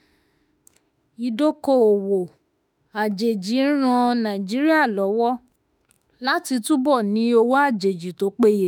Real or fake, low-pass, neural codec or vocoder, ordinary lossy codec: fake; none; autoencoder, 48 kHz, 32 numbers a frame, DAC-VAE, trained on Japanese speech; none